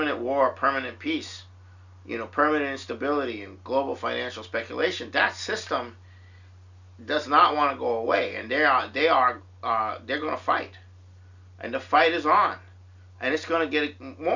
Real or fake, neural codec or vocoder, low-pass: real; none; 7.2 kHz